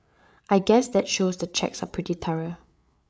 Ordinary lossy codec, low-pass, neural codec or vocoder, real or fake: none; none; codec, 16 kHz, 8 kbps, FreqCodec, larger model; fake